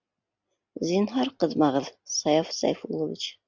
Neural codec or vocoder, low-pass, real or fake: none; 7.2 kHz; real